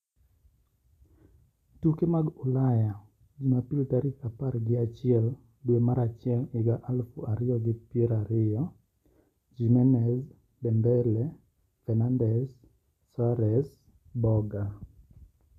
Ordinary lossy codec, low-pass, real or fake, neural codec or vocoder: none; 14.4 kHz; real; none